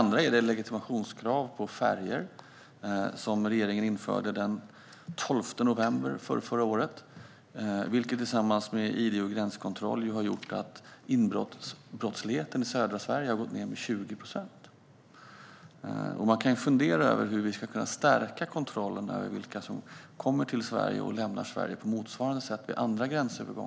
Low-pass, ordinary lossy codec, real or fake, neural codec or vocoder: none; none; real; none